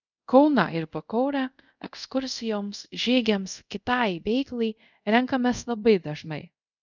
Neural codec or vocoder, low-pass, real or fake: codec, 24 kHz, 0.5 kbps, DualCodec; 7.2 kHz; fake